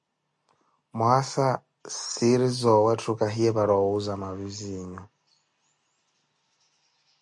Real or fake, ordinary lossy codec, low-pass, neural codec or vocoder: real; MP3, 48 kbps; 10.8 kHz; none